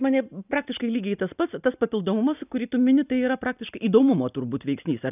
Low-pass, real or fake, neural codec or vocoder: 3.6 kHz; real; none